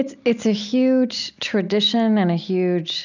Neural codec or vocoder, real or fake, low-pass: none; real; 7.2 kHz